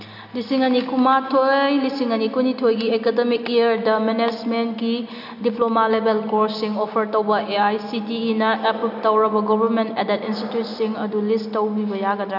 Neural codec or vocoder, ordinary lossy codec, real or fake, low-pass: none; none; real; 5.4 kHz